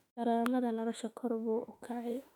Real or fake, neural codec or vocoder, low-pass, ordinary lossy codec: fake; autoencoder, 48 kHz, 32 numbers a frame, DAC-VAE, trained on Japanese speech; 19.8 kHz; none